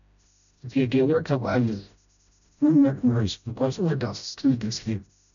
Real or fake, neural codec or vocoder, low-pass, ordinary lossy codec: fake; codec, 16 kHz, 0.5 kbps, FreqCodec, smaller model; 7.2 kHz; none